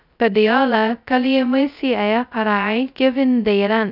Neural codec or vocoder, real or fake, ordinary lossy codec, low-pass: codec, 16 kHz, 0.2 kbps, FocalCodec; fake; none; 5.4 kHz